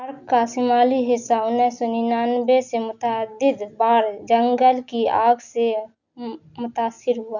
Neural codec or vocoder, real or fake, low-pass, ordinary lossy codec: none; real; 7.2 kHz; none